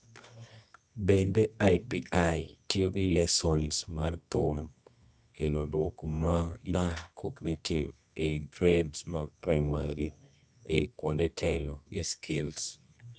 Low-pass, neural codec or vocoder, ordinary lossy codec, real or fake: 9.9 kHz; codec, 24 kHz, 0.9 kbps, WavTokenizer, medium music audio release; none; fake